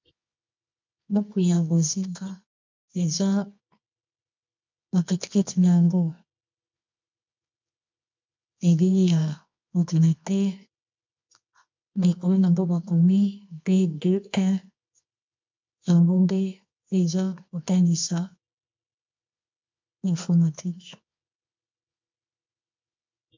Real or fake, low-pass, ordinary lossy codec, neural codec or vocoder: fake; 7.2 kHz; MP3, 64 kbps; codec, 24 kHz, 0.9 kbps, WavTokenizer, medium music audio release